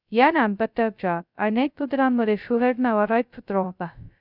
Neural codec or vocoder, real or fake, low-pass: codec, 16 kHz, 0.2 kbps, FocalCodec; fake; 5.4 kHz